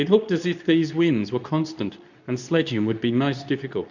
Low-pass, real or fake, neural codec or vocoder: 7.2 kHz; fake; codec, 24 kHz, 0.9 kbps, WavTokenizer, medium speech release version 2